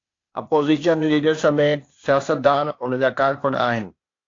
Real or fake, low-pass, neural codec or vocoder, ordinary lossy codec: fake; 7.2 kHz; codec, 16 kHz, 0.8 kbps, ZipCodec; AAC, 48 kbps